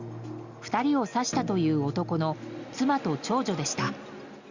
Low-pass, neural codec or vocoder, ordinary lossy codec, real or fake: 7.2 kHz; none; Opus, 64 kbps; real